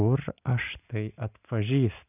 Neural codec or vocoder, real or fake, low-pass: none; real; 3.6 kHz